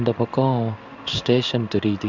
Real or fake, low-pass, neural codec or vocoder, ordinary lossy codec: fake; 7.2 kHz; codec, 16 kHz in and 24 kHz out, 1 kbps, XY-Tokenizer; none